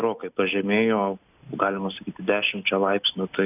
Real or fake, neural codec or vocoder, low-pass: real; none; 3.6 kHz